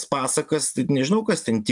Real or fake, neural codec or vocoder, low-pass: real; none; 10.8 kHz